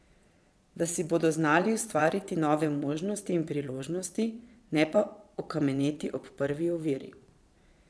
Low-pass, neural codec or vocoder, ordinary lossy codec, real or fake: none; vocoder, 22.05 kHz, 80 mel bands, Vocos; none; fake